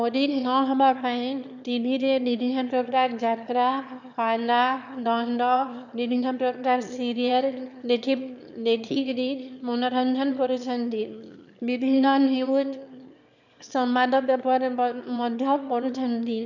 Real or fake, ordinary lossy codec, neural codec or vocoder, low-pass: fake; none; autoencoder, 22.05 kHz, a latent of 192 numbers a frame, VITS, trained on one speaker; 7.2 kHz